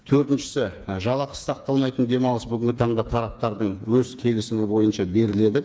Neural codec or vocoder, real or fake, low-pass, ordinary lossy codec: codec, 16 kHz, 4 kbps, FreqCodec, smaller model; fake; none; none